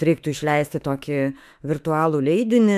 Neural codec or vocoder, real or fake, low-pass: autoencoder, 48 kHz, 32 numbers a frame, DAC-VAE, trained on Japanese speech; fake; 14.4 kHz